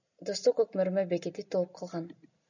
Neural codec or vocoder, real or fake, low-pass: none; real; 7.2 kHz